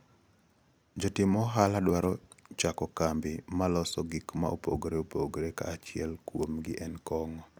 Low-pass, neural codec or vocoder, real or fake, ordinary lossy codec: none; none; real; none